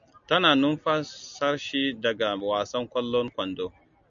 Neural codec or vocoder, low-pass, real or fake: none; 7.2 kHz; real